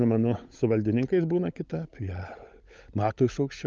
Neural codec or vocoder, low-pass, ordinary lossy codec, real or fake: codec, 16 kHz, 16 kbps, FunCodec, trained on LibriTTS, 50 frames a second; 7.2 kHz; Opus, 24 kbps; fake